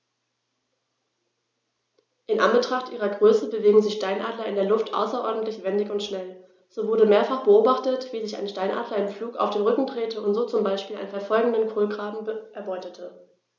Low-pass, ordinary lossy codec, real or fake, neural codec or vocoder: none; none; real; none